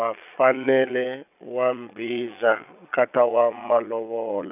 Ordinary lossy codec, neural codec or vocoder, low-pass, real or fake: none; vocoder, 22.05 kHz, 80 mel bands, Vocos; 3.6 kHz; fake